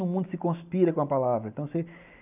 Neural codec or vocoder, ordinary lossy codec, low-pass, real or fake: none; none; 3.6 kHz; real